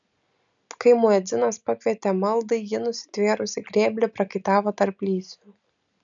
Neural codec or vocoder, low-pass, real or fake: none; 7.2 kHz; real